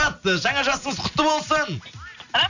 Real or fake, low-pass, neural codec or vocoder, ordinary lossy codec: real; 7.2 kHz; none; none